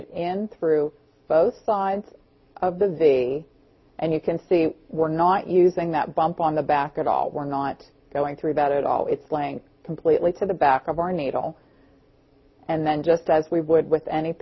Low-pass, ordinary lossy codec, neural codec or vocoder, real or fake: 7.2 kHz; MP3, 24 kbps; none; real